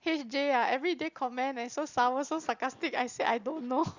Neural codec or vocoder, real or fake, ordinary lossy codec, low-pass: none; real; Opus, 64 kbps; 7.2 kHz